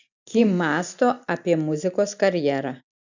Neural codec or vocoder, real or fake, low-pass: none; real; 7.2 kHz